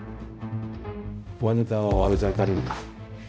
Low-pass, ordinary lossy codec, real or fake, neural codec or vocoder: none; none; fake; codec, 16 kHz, 0.5 kbps, X-Codec, HuBERT features, trained on balanced general audio